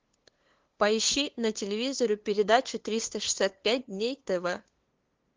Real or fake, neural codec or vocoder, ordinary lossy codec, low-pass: fake; codec, 16 kHz, 2 kbps, FunCodec, trained on LibriTTS, 25 frames a second; Opus, 16 kbps; 7.2 kHz